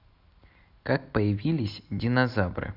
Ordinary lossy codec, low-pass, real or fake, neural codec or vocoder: none; 5.4 kHz; real; none